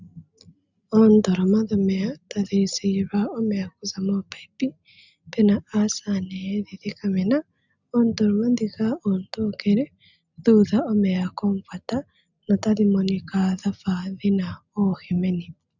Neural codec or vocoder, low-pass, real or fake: none; 7.2 kHz; real